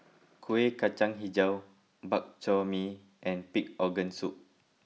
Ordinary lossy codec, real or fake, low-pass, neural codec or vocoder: none; real; none; none